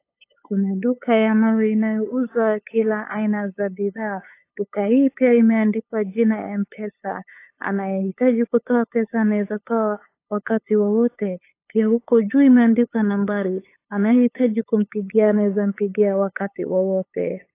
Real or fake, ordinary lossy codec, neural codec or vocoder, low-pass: fake; AAC, 24 kbps; codec, 16 kHz, 8 kbps, FunCodec, trained on LibriTTS, 25 frames a second; 3.6 kHz